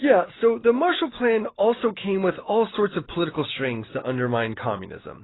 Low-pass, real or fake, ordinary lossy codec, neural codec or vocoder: 7.2 kHz; real; AAC, 16 kbps; none